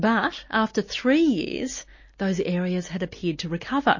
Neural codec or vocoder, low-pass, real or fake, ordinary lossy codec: none; 7.2 kHz; real; MP3, 32 kbps